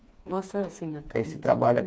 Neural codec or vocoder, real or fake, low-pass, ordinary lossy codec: codec, 16 kHz, 4 kbps, FreqCodec, smaller model; fake; none; none